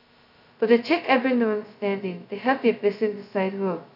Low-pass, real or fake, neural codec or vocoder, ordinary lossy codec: 5.4 kHz; fake; codec, 16 kHz, 0.2 kbps, FocalCodec; none